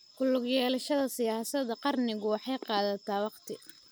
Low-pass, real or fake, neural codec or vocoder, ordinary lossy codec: none; real; none; none